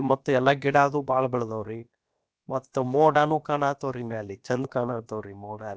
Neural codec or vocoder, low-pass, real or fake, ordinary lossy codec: codec, 16 kHz, about 1 kbps, DyCAST, with the encoder's durations; none; fake; none